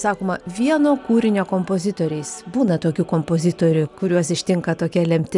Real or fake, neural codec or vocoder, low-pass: real; none; 10.8 kHz